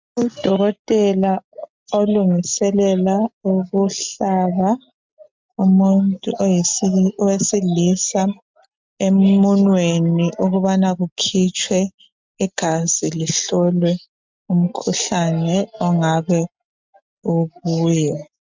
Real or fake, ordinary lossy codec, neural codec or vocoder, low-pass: real; MP3, 64 kbps; none; 7.2 kHz